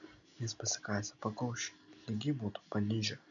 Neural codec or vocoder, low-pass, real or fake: none; 7.2 kHz; real